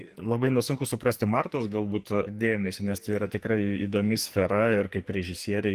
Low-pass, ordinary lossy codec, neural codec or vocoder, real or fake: 14.4 kHz; Opus, 24 kbps; codec, 44.1 kHz, 2.6 kbps, SNAC; fake